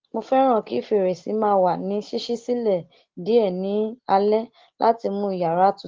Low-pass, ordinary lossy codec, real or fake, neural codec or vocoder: 7.2 kHz; Opus, 16 kbps; real; none